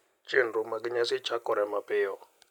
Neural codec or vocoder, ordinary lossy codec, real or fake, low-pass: none; none; real; 19.8 kHz